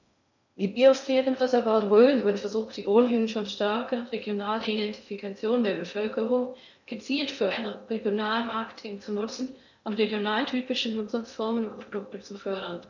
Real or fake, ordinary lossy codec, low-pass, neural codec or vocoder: fake; none; 7.2 kHz; codec, 16 kHz in and 24 kHz out, 0.6 kbps, FocalCodec, streaming, 2048 codes